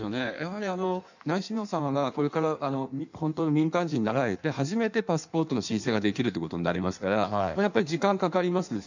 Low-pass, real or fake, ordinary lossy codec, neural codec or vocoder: 7.2 kHz; fake; none; codec, 16 kHz in and 24 kHz out, 1.1 kbps, FireRedTTS-2 codec